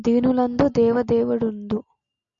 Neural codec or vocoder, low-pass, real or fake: none; 7.2 kHz; real